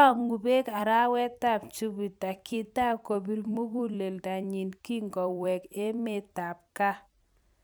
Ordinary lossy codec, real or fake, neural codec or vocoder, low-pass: none; fake; vocoder, 44.1 kHz, 128 mel bands every 256 samples, BigVGAN v2; none